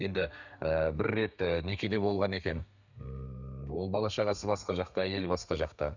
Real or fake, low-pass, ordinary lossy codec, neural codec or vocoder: fake; 7.2 kHz; none; codec, 32 kHz, 1.9 kbps, SNAC